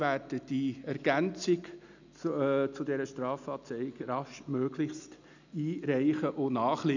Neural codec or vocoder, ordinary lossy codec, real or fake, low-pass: none; none; real; 7.2 kHz